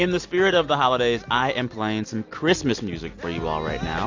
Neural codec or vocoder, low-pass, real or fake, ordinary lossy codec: none; 7.2 kHz; real; AAC, 48 kbps